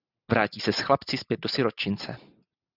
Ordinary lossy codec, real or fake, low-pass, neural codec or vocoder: Opus, 64 kbps; real; 5.4 kHz; none